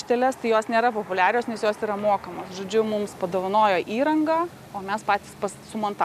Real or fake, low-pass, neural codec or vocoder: real; 14.4 kHz; none